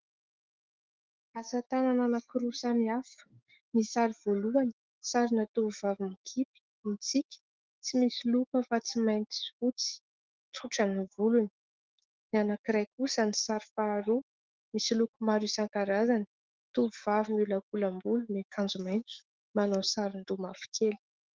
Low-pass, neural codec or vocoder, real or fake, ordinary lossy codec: 7.2 kHz; autoencoder, 48 kHz, 128 numbers a frame, DAC-VAE, trained on Japanese speech; fake; Opus, 16 kbps